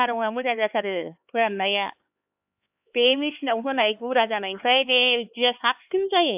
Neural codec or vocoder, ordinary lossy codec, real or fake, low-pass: codec, 16 kHz, 4 kbps, X-Codec, HuBERT features, trained on LibriSpeech; AAC, 32 kbps; fake; 3.6 kHz